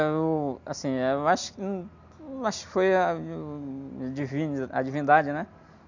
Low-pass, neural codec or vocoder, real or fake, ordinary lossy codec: 7.2 kHz; none; real; none